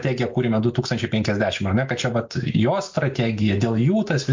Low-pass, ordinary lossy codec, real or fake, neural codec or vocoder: 7.2 kHz; MP3, 48 kbps; real; none